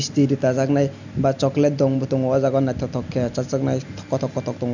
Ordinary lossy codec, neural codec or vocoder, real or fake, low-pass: none; none; real; 7.2 kHz